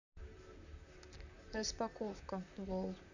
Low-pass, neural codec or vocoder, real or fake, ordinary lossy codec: 7.2 kHz; vocoder, 44.1 kHz, 128 mel bands, Pupu-Vocoder; fake; none